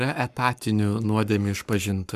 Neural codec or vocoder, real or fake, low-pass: codec, 44.1 kHz, 7.8 kbps, Pupu-Codec; fake; 14.4 kHz